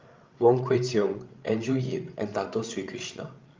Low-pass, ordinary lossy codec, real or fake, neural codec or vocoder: 7.2 kHz; Opus, 24 kbps; fake; codec, 16 kHz, 16 kbps, FreqCodec, larger model